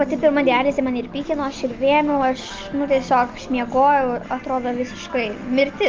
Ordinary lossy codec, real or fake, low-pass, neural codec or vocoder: Opus, 24 kbps; real; 7.2 kHz; none